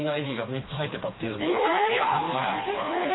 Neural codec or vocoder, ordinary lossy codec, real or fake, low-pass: codec, 16 kHz, 2 kbps, FreqCodec, smaller model; AAC, 16 kbps; fake; 7.2 kHz